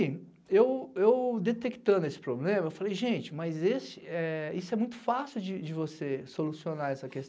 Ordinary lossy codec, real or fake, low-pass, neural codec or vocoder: none; real; none; none